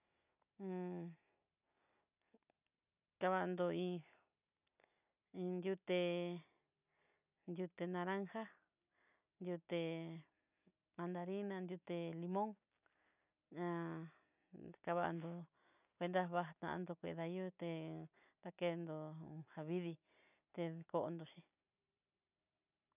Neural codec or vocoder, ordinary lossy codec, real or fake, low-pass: none; none; real; 3.6 kHz